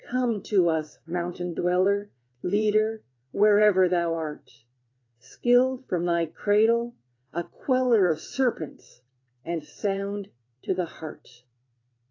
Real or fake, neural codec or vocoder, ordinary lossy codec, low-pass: fake; vocoder, 22.05 kHz, 80 mel bands, WaveNeXt; AAC, 32 kbps; 7.2 kHz